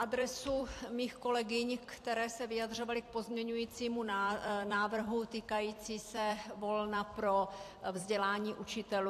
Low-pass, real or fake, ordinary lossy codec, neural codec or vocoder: 14.4 kHz; fake; AAC, 64 kbps; vocoder, 44.1 kHz, 128 mel bands every 256 samples, BigVGAN v2